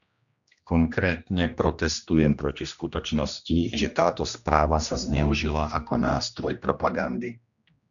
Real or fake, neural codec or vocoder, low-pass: fake; codec, 16 kHz, 1 kbps, X-Codec, HuBERT features, trained on general audio; 7.2 kHz